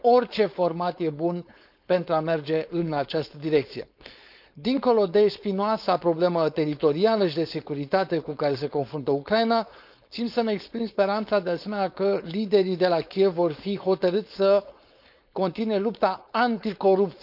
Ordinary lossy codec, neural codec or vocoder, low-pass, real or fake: none; codec, 16 kHz, 4.8 kbps, FACodec; 5.4 kHz; fake